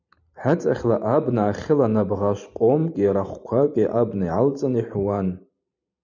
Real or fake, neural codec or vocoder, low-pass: fake; vocoder, 44.1 kHz, 128 mel bands every 512 samples, BigVGAN v2; 7.2 kHz